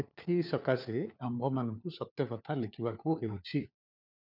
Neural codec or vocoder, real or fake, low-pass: codec, 16 kHz, 4 kbps, FunCodec, trained on LibriTTS, 50 frames a second; fake; 5.4 kHz